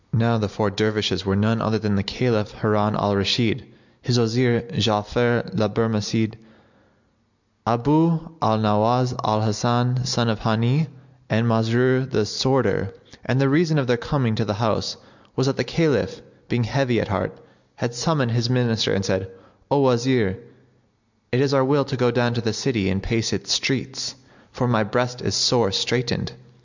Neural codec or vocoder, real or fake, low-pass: none; real; 7.2 kHz